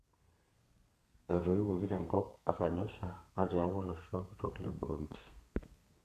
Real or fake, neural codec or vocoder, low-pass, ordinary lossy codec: fake; codec, 32 kHz, 1.9 kbps, SNAC; 14.4 kHz; none